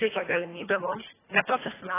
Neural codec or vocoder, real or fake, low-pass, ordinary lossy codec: codec, 24 kHz, 1.5 kbps, HILCodec; fake; 3.6 kHz; AAC, 16 kbps